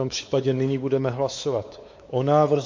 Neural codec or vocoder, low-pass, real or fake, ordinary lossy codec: codec, 16 kHz, 4 kbps, X-Codec, WavLM features, trained on Multilingual LibriSpeech; 7.2 kHz; fake; MP3, 48 kbps